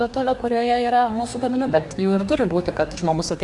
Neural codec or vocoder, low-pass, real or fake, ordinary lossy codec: codec, 24 kHz, 1 kbps, SNAC; 10.8 kHz; fake; Opus, 64 kbps